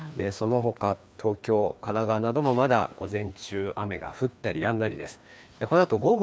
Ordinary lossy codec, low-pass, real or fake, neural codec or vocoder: none; none; fake; codec, 16 kHz, 2 kbps, FreqCodec, larger model